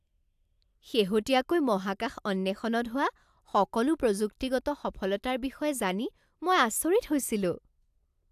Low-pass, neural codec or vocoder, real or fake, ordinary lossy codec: 14.4 kHz; none; real; AAC, 96 kbps